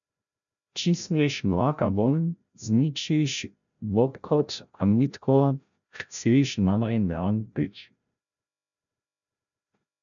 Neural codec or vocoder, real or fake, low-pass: codec, 16 kHz, 0.5 kbps, FreqCodec, larger model; fake; 7.2 kHz